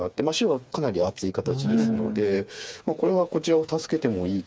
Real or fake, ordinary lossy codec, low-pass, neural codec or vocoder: fake; none; none; codec, 16 kHz, 4 kbps, FreqCodec, smaller model